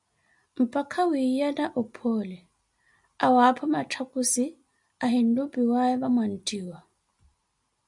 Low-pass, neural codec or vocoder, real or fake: 10.8 kHz; none; real